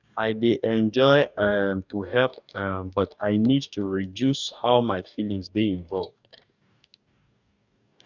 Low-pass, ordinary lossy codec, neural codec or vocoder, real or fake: 7.2 kHz; none; codec, 44.1 kHz, 2.6 kbps, DAC; fake